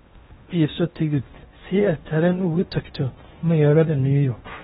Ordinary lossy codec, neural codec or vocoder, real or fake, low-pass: AAC, 16 kbps; codec, 16 kHz in and 24 kHz out, 0.8 kbps, FocalCodec, streaming, 65536 codes; fake; 10.8 kHz